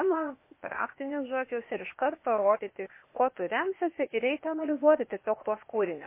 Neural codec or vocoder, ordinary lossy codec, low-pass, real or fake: codec, 16 kHz, 0.8 kbps, ZipCodec; MP3, 24 kbps; 3.6 kHz; fake